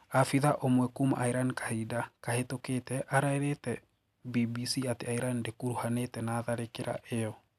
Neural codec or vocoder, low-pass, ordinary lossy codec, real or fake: none; 14.4 kHz; none; real